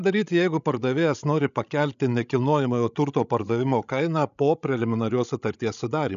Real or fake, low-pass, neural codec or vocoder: fake; 7.2 kHz; codec, 16 kHz, 16 kbps, FreqCodec, larger model